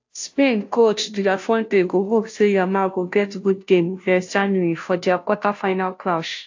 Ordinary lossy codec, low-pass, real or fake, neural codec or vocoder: AAC, 48 kbps; 7.2 kHz; fake; codec, 16 kHz, 0.5 kbps, FunCodec, trained on Chinese and English, 25 frames a second